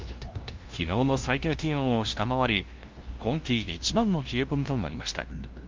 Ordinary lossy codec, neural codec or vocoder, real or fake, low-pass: Opus, 32 kbps; codec, 16 kHz, 0.5 kbps, FunCodec, trained on LibriTTS, 25 frames a second; fake; 7.2 kHz